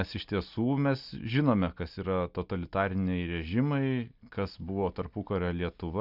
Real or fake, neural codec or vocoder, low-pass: real; none; 5.4 kHz